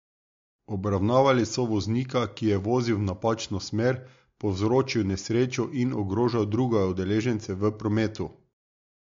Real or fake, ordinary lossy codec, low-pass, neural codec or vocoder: real; MP3, 48 kbps; 7.2 kHz; none